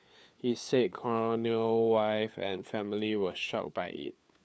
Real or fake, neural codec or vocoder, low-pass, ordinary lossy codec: fake; codec, 16 kHz, 4 kbps, FunCodec, trained on LibriTTS, 50 frames a second; none; none